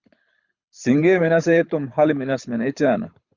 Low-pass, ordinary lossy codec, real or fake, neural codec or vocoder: 7.2 kHz; Opus, 64 kbps; fake; codec, 24 kHz, 6 kbps, HILCodec